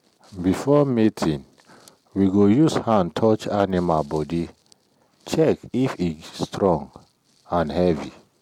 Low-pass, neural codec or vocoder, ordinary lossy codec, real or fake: 19.8 kHz; none; MP3, 96 kbps; real